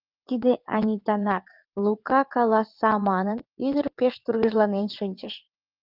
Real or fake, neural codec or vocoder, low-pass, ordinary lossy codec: fake; vocoder, 22.05 kHz, 80 mel bands, WaveNeXt; 5.4 kHz; Opus, 32 kbps